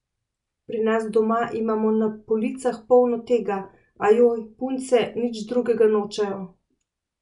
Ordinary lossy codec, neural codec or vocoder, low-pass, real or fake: none; none; 10.8 kHz; real